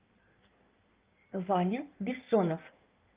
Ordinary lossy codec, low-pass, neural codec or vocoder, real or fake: Opus, 24 kbps; 3.6 kHz; codec, 16 kHz in and 24 kHz out, 1.1 kbps, FireRedTTS-2 codec; fake